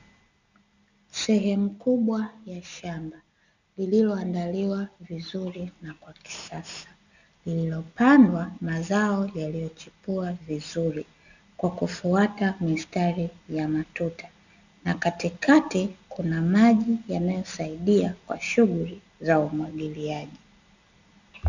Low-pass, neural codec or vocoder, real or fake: 7.2 kHz; none; real